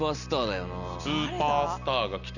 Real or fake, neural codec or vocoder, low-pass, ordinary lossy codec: real; none; 7.2 kHz; none